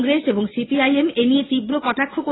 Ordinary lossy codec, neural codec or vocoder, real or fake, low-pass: AAC, 16 kbps; none; real; 7.2 kHz